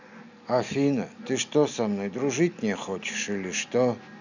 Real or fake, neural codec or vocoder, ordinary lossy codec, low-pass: real; none; none; 7.2 kHz